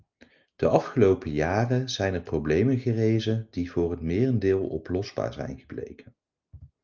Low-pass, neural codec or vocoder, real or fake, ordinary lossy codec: 7.2 kHz; none; real; Opus, 24 kbps